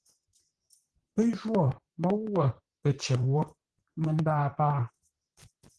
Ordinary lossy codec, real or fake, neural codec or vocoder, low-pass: Opus, 16 kbps; fake; vocoder, 44.1 kHz, 128 mel bands, Pupu-Vocoder; 10.8 kHz